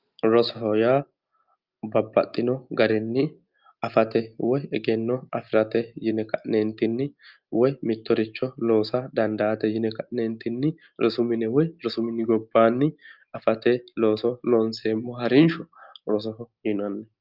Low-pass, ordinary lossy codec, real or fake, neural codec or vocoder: 5.4 kHz; Opus, 24 kbps; real; none